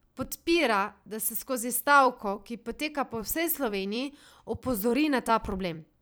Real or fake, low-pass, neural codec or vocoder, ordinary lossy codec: fake; none; vocoder, 44.1 kHz, 128 mel bands every 256 samples, BigVGAN v2; none